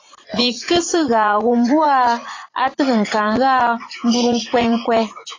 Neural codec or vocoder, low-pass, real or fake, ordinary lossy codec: vocoder, 44.1 kHz, 80 mel bands, Vocos; 7.2 kHz; fake; AAC, 32 kbps